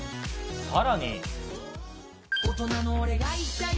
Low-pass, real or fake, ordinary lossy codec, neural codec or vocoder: none; real; none; none